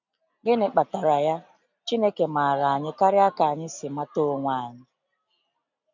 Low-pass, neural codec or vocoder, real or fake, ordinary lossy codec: 7.2 kHz; none; real; none